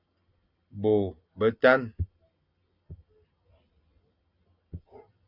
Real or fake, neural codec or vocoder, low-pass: real; none; 5.4 kHz